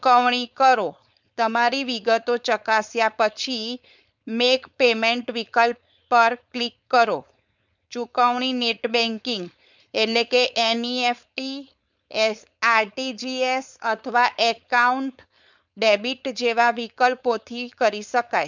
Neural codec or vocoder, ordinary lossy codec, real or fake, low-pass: codec, 16 kHz, 4.8 kbps, FACodec; none; fake; 7.2 kHz